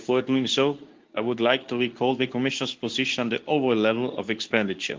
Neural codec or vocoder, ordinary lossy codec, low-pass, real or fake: codec, 24 kHz, 0.9 kbps, WavTokenizer, medium speech release version 2; Opus, 24 kbps; 7.2 kHz; fake